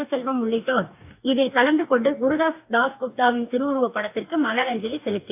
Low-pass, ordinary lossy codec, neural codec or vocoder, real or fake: 3.6 kHz; AAC, 32 kbps; codec, 44.1 kHz, 2.6 kbps, DAC; fake